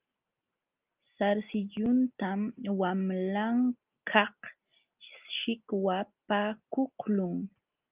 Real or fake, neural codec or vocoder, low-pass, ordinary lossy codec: real; none; 3.6 kHz; Opus, 24 kbps